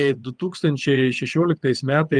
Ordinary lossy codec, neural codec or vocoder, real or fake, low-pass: Opus, 32 kbps; vocoder, 22.05 kHz, 80 mel bands, WaveNeXt; fake; 9.9 kHz